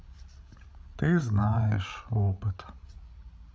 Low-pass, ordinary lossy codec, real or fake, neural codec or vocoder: none; none; fake; codec, 16 kHz, 16 kbps, FreqCodec, larger model